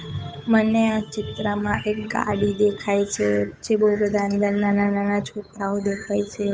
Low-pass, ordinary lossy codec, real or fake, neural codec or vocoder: none; none; fake; codec, 16 kHz, 8 kbps, FunCodec, trained on Chinese and English, 25 frames a second